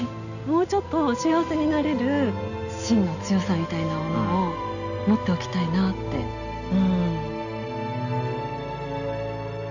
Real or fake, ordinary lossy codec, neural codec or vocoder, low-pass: real; none; none; 7.2 kHz